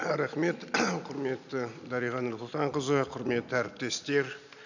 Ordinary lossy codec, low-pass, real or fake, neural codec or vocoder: none; 7.2 kHz; real; none